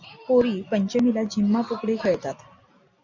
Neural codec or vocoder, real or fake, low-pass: none; real; 7.2 kHz